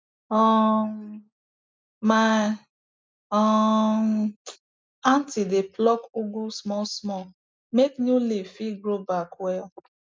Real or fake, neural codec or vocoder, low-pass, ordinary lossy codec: real; none; none; none